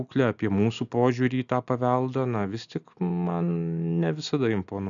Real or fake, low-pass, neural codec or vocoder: real; 7.2 kHz; none